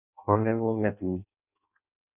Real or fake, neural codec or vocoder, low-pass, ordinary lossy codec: fake; codec, 16 kHz in and 24 kHz out, 0.6 kbps, FireRedTTS-2 codec; 3.6 kHz; none